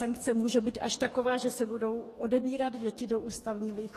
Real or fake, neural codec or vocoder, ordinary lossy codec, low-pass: fake; codec, 44.1 kHz, 2.6 kbps, DAC; AAC, 48 kbps; 14.4 kHz